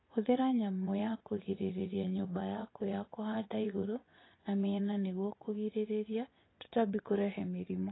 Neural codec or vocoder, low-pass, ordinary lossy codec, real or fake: vocoder, 24 kHz, 100 mel bands, Vocos; 7.2 kHz; AAC, 16 kbps; fake